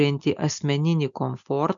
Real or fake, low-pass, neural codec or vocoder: real; 7.2 kHz; none